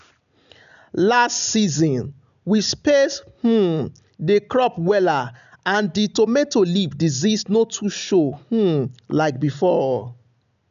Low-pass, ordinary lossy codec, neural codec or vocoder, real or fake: 7.2 kHz; none; none; real